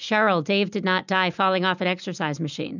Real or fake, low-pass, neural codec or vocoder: real; 7.2 kHz; none